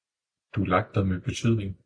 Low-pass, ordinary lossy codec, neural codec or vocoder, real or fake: 9.9 kHz; AAC, 64 kbps; none; real